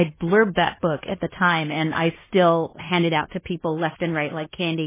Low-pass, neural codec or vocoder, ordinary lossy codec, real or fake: 3.6 kHz; codec, 16 kHz in and 24 kHz out, 0.9 kbps, LongCat-Audio-Codec, fine tuned four codebook decoder; MP3, 16 kbps; fake